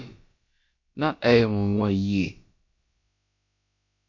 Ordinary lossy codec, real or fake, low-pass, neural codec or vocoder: MP3, 48 kbps; fake; 7.2 kHz; codec, 16 kHz, about 1 kbps, DyCAST, with the encoder's durations